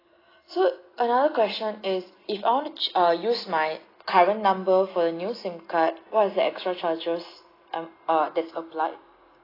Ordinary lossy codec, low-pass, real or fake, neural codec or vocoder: AAC, 24 kbps; 5.4 kHz; real; none